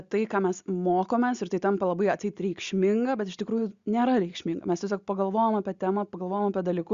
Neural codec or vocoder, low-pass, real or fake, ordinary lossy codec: none; 7.2 kHz; real; Opus, 64 kbps